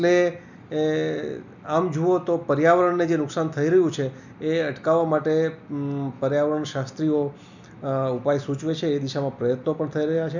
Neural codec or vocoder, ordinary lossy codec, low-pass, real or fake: none; none; 7.2 kHz; real